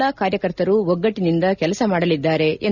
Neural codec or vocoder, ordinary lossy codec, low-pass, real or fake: none; none; 7.2 kHz; real